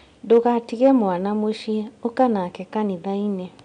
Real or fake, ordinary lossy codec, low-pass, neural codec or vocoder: real; none; 9.9 kHz; none